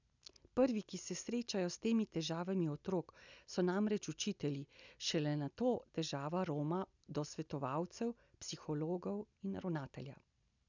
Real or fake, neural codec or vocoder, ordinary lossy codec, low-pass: real; none; none; 7.2 kHz